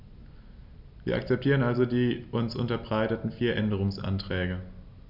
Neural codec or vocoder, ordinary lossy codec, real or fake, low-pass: none; none; real; 5.4 kHz